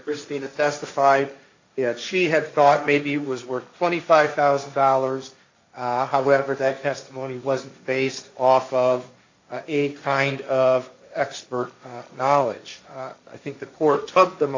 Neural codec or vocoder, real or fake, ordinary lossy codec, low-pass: codec, 16 kHz, 1.1 kbps, Voila-Tokenizer; fake; AAC, 48 kbps; 7.2 kHz